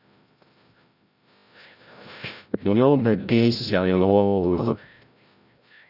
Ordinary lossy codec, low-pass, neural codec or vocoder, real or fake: Opus, 64 kbps; 5.4 kHz; codec, 16 kHz, 0.5 kbps, FreqCodec, larger model; fake